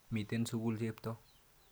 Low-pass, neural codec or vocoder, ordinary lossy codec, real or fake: none; none; none; real